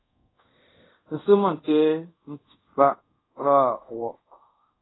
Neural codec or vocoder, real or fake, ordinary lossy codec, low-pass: codec, 24 kHz, 0.5 kbps, DualCodec; fake; AAC, 16 kbps; 7.2 kHz